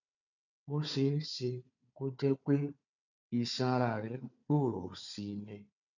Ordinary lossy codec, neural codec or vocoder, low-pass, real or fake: none; codec, 16 kHz, 4 kbps, FunCodec, trained on Chinese and English, 50 frames a second; 7.2 kHz; fake